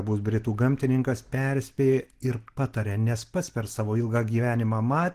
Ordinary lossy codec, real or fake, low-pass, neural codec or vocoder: Opus, 16 kbps; real; 14.4 kHz; none